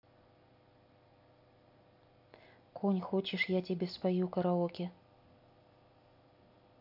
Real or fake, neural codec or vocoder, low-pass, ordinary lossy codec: real; none; 5.4 kHz; AAC, 48 kbps